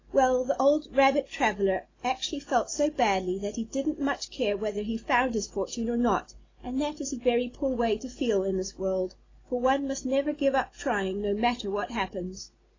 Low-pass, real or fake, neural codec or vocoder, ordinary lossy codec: 7.2 kHz; real; none; AAC, 32 kbps